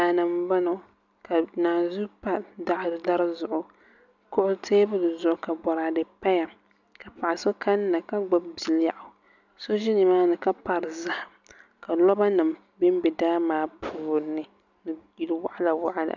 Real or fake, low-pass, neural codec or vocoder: real; 7.2 kHz; none